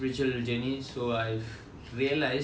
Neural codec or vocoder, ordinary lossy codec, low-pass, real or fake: none; none; none; real